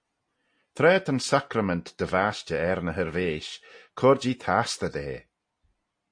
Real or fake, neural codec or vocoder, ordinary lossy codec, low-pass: real; none; MP3, 48 kbps; 9.9 kHz